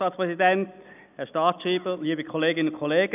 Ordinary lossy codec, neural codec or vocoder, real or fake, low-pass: none; none; real; 3.6 kHz